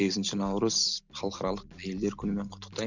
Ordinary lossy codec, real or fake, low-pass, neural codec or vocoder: none; real; 7.2 kHz; none